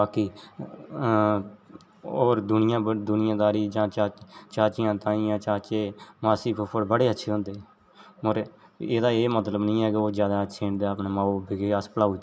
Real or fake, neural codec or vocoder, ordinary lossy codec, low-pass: real; none; none; none